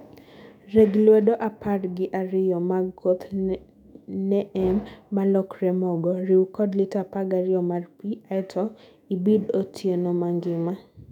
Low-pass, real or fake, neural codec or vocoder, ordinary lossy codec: 19.8 kHz; fake; autoencoder, 48 kHz, 128 numbers a frame, DAC-VAE, trained on Japanese speech; none